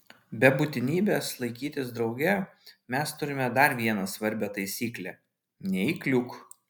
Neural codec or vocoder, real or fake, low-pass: none; real; 19.8 kHz